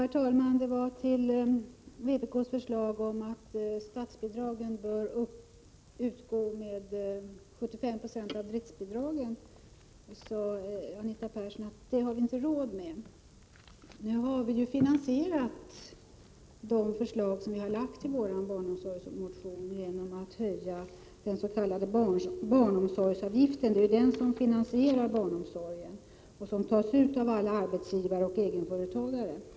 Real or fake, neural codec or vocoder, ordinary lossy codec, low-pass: real; none; none; none